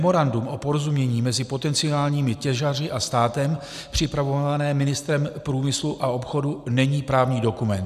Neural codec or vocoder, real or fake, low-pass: none; real; 14.4 kHz